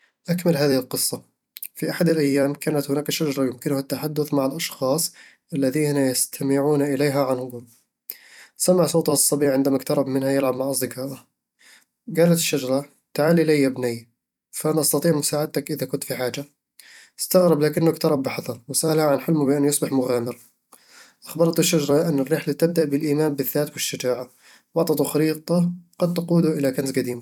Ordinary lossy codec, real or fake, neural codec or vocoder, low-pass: none; fake; vocoder, 44.1 kHz, 128 mel bands every 256 samples, BigVGAN v2; 19.8 kHz